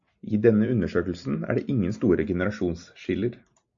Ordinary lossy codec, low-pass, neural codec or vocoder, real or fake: AAC, 64 kbps; 7.2 kHz; none; real